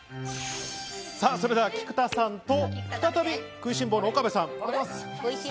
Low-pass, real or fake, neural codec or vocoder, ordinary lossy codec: none; real; none; none